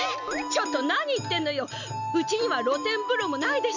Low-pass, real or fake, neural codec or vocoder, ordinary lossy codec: 7.2 kHz; real; none; none